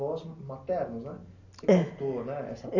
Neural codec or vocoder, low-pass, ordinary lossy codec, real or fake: none; 7.2 kHz; none; real